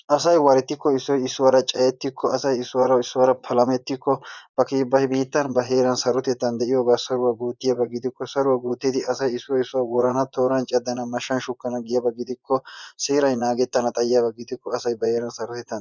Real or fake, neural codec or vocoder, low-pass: fake; vocoder, 24 kHz, 100 mel bands, Vocos; 7.2 kHz